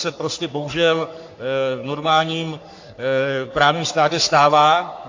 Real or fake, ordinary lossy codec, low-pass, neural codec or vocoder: fake; AAC, 48 kbps; 7.2 kHz; codec, 44.1 kHz, 3.4 kbps, Pupu-Codec